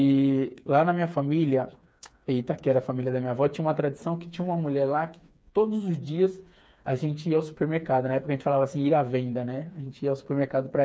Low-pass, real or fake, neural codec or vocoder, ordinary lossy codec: none; fake; codec, 16 kHz, 4 kbps, FreqCodec, smaller model; none